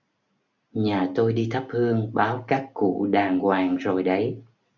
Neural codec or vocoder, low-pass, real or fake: none; 7.2 kHz; real